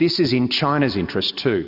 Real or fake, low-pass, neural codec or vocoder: real; 5.4 kHz; none